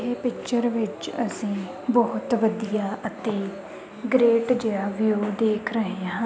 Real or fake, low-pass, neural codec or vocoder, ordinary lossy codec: real; none; none; none